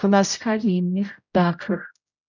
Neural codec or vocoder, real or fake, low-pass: codec, 16 kHz, 0.5 kbps, X-Codec, HuBERT features, trained on general audio; fake; 7.2 kHz